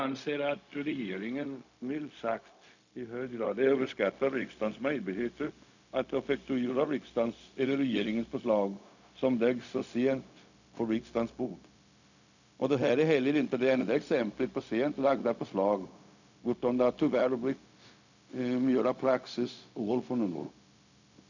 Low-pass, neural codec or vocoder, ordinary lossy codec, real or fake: 7.2 kHz; codec, 16 kHz, 0.4 kbps, LongCat-Audio-Codec; none; fake